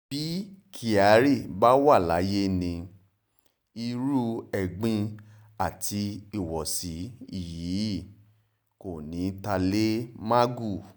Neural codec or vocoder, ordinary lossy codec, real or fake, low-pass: none; none; real; none